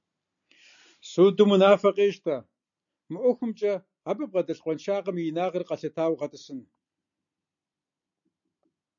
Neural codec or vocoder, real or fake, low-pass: none; real; 7.2 kHz